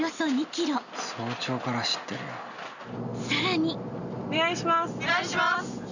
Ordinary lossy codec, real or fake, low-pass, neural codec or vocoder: none; real; 7.2 kHz; none